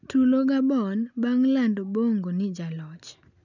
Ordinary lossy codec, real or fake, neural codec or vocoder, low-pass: none; real; none; 7.2 kHz